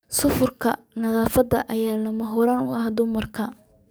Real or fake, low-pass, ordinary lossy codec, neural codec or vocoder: fake; none; none; codec, 44.1 kHz, 7.8 kbps, DAC